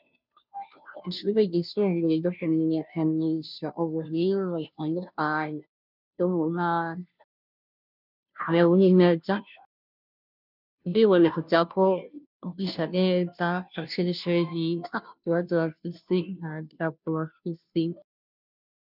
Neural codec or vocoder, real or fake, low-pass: codec, 16 kHz, 0.5 kbps, FunCodec, trained on Chinese and English, 25 frames a second; fake; 5.4 kHz